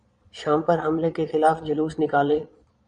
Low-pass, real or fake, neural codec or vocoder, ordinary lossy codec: 9.9 kHz; fake; vocoder, 22.05 kHz, 80 mel bands, Vocos; MP3, 96 kbps